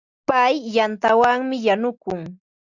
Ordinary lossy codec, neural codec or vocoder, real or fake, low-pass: Opus, 64 kbps; none; real; 7.2 kHz